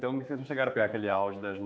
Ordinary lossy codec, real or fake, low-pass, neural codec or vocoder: none; fake; none; codec, 16 kHz, 4 kbps, X-Codec, HuBERT features, trained on general audio